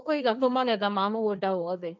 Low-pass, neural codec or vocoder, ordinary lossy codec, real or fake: none; codec, 16 kHz, 1.1 kbps, Voila-Tokenizer; none; fake